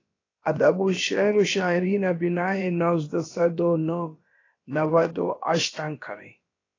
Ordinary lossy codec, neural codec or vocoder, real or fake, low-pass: AAC, 32 kbps; codec, 16 kHz, about 1 kbps, DyCAST, with the encoder's durations; fake; 7.2 kHz